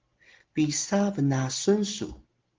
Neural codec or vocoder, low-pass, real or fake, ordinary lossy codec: none; 7.2 kHz; real; Opus, 16 kbps